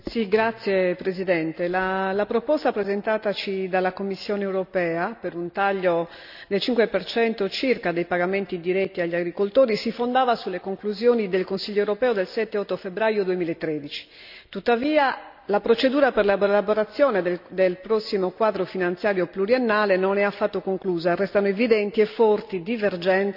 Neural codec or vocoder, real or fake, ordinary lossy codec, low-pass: none; real; none; 5.4 kHz